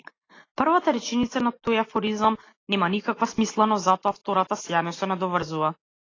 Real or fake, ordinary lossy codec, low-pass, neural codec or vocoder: real; AAC, 32 kbps; 7.2 kHz; none